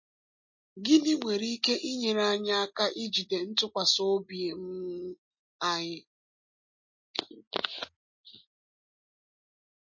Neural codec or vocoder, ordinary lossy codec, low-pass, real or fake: none; MP3, 32 kbps; 7.2 kHz; real